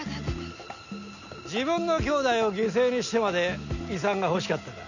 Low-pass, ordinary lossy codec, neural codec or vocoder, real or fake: 7.2 kHz; none; none; real